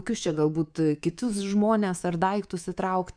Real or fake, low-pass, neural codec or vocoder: fake; 9.9 kHz; codec, 24 kHz, 3.1 kbps, DualCodec